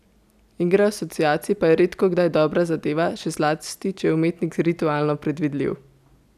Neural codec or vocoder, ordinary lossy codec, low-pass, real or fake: none; none; 14.4 kHz; real